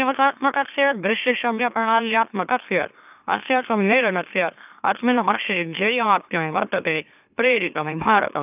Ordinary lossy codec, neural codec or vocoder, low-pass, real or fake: none; autoencoder, 44.1 kHz, a latent of 192 numbers a frame, MeloTTS; 3.6 kHz; fake